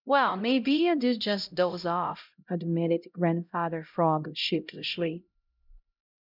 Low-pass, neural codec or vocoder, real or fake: 5.4 kHz; codec, 16 kHz, 0.5 kbps, X-Codec, HuBERT features, trained on LibriSpeech; fake